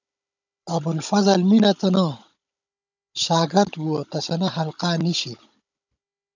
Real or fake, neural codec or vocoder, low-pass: fake; codec, 16 kHz, 16 kbps, FunCodec, trained on Chinese and English, 50 frames a second; 7.2 kHz